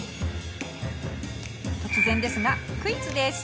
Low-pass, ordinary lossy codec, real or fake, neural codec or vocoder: none; none; real; none